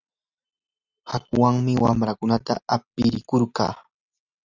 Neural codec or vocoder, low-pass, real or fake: none; 7.2 kHz; real